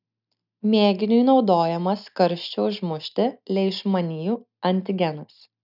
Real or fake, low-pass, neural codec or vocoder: real; 5.4 kHz; none